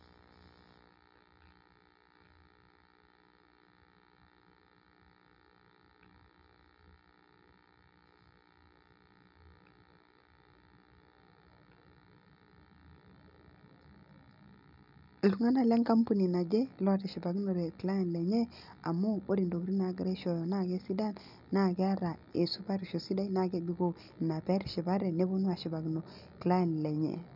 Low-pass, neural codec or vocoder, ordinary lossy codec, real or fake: 5.4 kHz; none; none; real